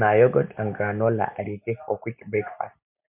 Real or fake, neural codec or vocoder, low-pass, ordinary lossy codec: real; none; 3.6 kHz; MP3, 32 kbps